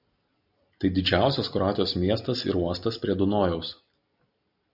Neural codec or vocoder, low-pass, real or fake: none; 5.4 kHz; real